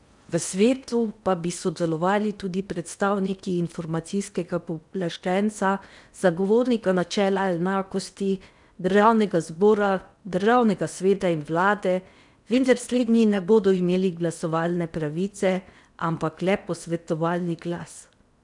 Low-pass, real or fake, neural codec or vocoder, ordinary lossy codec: 10.8 kHz; fake; codec, 16 kHz in and 24 kHz out, 0.8 kbps, FocalCodec, streaming, 65536 codes; none